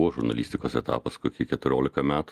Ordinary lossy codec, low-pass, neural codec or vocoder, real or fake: Opus, 24 kbps; 14.4 kHz; none; real